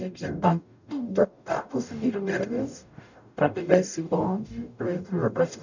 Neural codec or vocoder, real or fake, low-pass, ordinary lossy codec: codec, 44.1 kHz, 0.9 kbps, DAC; fake; 7.2 kHz; none